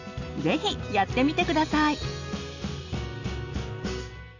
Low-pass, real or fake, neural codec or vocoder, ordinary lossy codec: 7.2 kHz; real; none; none